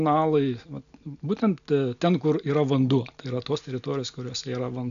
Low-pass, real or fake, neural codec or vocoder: 7.2 kHz; real; none